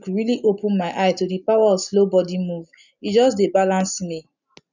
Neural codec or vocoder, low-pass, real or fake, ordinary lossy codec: none; 7.2 kHz; real; none